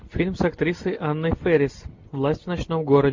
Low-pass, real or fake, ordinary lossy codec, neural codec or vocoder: 7.2 kHz; real; MP3, 48 kbps; none